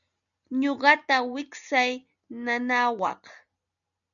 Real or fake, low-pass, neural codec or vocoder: real; 7.2 kHz; none